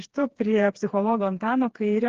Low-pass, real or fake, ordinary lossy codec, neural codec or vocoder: 7.2 kHz; fake; Opus, 16 kbps; codec, 16 kHz, 4 kbps, FreqCodec, smaller model